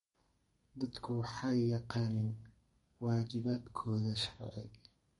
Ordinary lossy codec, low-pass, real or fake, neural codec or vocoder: MP3, 48 kbps; 14.4 kHz; fake; codec, 32 kHz, 1.9 kbps, SNAC